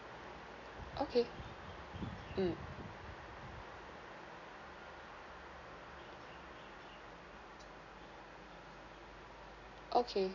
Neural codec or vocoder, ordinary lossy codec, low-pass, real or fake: none; none; 7.2 kHz; real